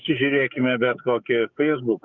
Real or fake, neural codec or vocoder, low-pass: fake; codec, 44.1 kHz, 7.8 kbps, DAC; 7.2 kHz